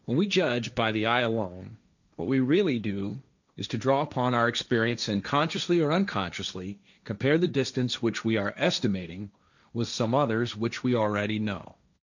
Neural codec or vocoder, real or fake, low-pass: codec, 16 kHz, 1.1 kbps, Voila-Tokenizer; fake; 7.2 kHz